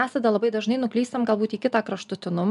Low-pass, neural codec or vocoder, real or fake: 10.8 kHz; none; real